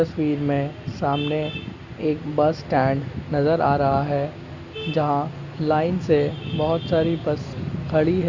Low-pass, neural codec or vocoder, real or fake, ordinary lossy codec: 7.2 kHz; none; real; Opus, 64 kbps